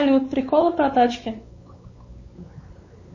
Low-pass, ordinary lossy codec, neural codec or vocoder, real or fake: 7.2 kHz; MP3, 32 kbps; codec, 16 kHz, 4 kbps, X-Codec, WavLM features, trained on Multilingual LibriSpeech; fake